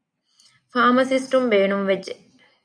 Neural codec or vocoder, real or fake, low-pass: none; real; 9.9 kHz